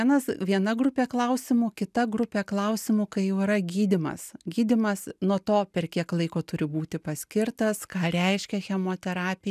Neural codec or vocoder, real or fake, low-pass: none; real; 14.4 kHz